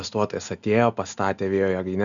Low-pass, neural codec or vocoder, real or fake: 7.2 kHz; none; real